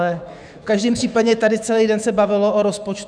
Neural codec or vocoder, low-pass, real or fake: autoencoder, 48 kHz, 128 numbers a frame, DAC-VAE, trained on Japanese speech; 9.9 kHz; fake